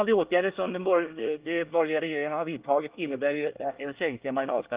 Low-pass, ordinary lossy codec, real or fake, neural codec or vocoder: 3.6 kHz; Opus, 32 kbps; fake; codec, 24 kHz, 1 kbps, SNAC